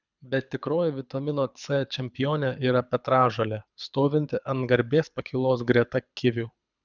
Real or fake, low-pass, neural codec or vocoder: fake; 7.2 kHz; codec, 24 kHz, 6 kbps, HILCodec